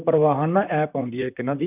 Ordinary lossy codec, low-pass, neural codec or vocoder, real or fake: none; 3.6 kHz; vocoder, 44.1 kHz, 128 mel bands, Pupu-Vocoder; fake